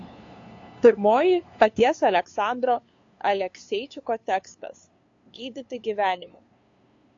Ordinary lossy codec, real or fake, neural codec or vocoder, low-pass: AAC, 48 kbps; fake; codec, 16 kHz, 2 kbps, FunCodec, trained on LibriTTS, 25 frames a second; 7.2 kHz